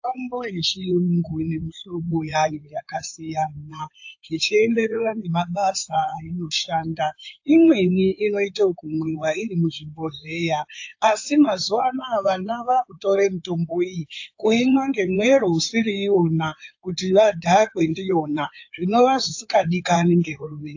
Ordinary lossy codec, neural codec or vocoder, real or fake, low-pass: AAC, 48 kbps; codec, 16 kHz in and 24 kHz out, 2.2 kbps, FireRedTTS-2 codec; fake; 7.2 kHz